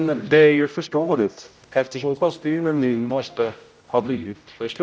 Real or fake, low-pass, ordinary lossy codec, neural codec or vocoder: fake; none; none; codec, 16 kHz, 0.5 kbps, X-Codec, HuBERT features, trained on general audio